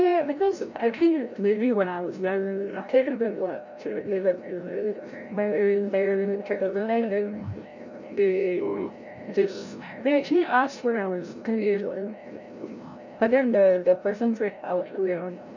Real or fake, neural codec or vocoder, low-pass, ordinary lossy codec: fake; codec, 16 kHz, 0.5 kbps, FreqCodec, larger model; 7.2 kHz; none